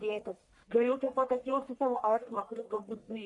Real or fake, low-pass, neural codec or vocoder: fake; 10.8 kHz; codec, 44.1 kHz, 1.7 kbps, Pupu-Codec